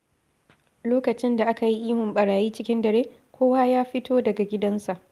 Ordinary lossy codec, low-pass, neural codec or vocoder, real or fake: Opus, 32 kbps; 14.4 kHz; none; real